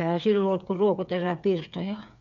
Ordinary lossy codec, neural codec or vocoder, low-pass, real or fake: none; codec, 16 kHz, 8 kbps, FreqCodec, smaller model; 7.2 kHz; fake